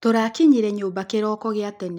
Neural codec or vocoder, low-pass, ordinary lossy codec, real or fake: none; 19.8 kHz; none; real